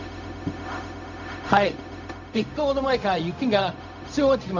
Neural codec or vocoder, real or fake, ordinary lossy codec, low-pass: codec, 16 kHz, 0.4 kbps, LongCat-Audio-Codec; fake; none; 7.2 kHz